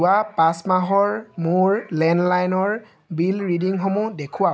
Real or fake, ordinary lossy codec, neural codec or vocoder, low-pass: real; none; none; none